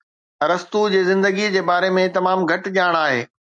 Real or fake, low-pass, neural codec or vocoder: real; 9.9 kHz; none